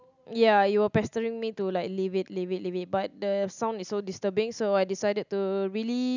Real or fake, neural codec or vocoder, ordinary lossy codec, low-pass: real; none; none; 7.2 kHz